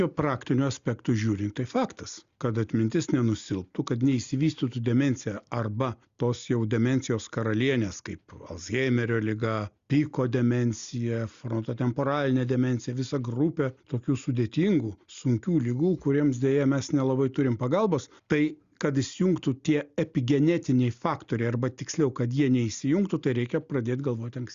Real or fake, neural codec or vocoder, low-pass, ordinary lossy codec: real; none; 7.2 kHz; Opus, 64 kbps